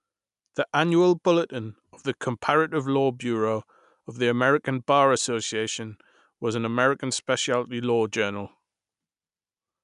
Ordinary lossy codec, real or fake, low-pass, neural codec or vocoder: none; real; 10.8 kHz; none